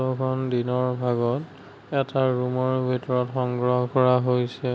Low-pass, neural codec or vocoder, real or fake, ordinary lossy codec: none; none; real; none